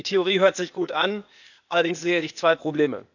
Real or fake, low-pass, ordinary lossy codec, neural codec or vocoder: fake; 7.2 kHz; none; codec, 16 kHz, 0.8 kbps, ZipCodec